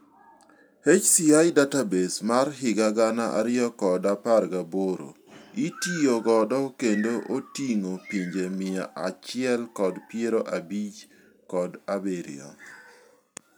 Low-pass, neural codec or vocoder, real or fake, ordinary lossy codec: none; none; real; none